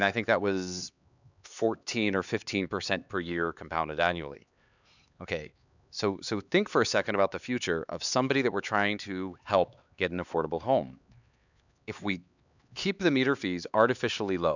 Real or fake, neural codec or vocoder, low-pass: fake; codec, 16 kHz, 4 kbps, X-Codec, HuBERT features, trained on LibriSpeech; 7.2 kHz